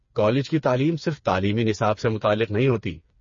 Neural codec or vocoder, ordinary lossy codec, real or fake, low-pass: codec, 16 kHz, 4 kbps, FreqCodec, smaller model; MP3, 32 kbps; fake; 7.2 kHz